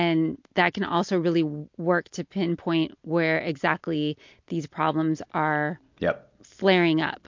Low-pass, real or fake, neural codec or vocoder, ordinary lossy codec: 7.2 kHz; real; none; MP3, 48 kbps